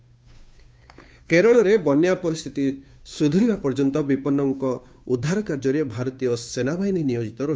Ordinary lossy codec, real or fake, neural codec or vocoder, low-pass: none; fake; codec, 16 kHz, 2 kbps, FunCodec, trained on Chinese and English, 25 frames a second; none